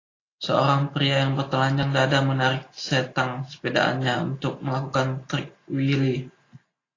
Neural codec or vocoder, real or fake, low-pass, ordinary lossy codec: none; real; 7.2 kHz; AAC, 32 kbps